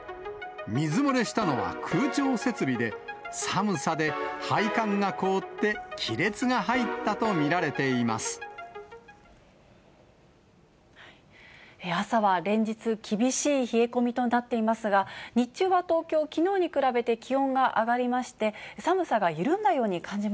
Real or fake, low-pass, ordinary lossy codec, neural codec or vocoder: real; none; none; none